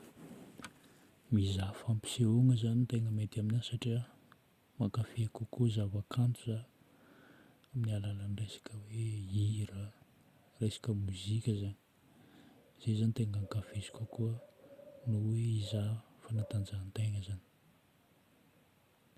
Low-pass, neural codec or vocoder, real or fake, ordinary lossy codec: 14.4 kHz; none; real; Opus, 64 kbps